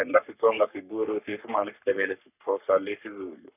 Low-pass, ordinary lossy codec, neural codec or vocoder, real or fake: 3.6 kHz; none; codec, 44.1 kHz, 3.4 kbps, Pupu-Codec; fake